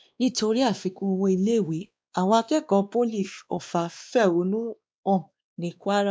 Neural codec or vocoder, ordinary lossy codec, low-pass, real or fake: codec, 16 kHz, 2 kbps, X-Codec, WavLM features, trained on Multilingual LibriSpeech; none; none; fake